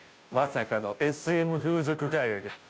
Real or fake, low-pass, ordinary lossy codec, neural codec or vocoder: fake; none; none; codec, 16 kHz, 0.5 kbps, FunCodec, trained on Chinese and English, 25 frames a second